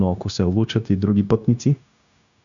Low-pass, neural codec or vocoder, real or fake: 7.2 kHz; codec, 16 kHz, 0.9 kbps, LongCat-Audio-Codec; fake